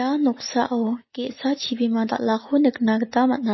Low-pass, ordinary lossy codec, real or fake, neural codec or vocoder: 7.2 kHz; MP3, 24 kbps; real; none